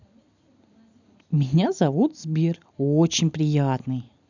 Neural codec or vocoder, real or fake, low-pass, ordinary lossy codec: none; real; 7.2 kHz; none